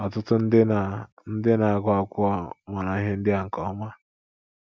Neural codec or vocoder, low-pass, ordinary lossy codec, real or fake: none; none; none; real